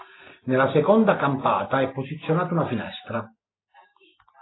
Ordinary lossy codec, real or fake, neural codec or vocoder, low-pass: AAC, 16 kbps; real; none; 7.2 kHz